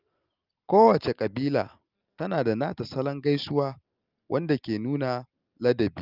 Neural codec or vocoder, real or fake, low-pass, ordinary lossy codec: none; real; 5.4 kHz; Opus, 24 kbps